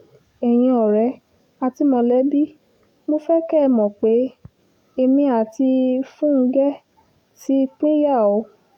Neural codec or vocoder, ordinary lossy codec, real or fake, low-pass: codec, 44.1 kHz, 7.8 kbps, DAC; none; fake; 19.8 kHz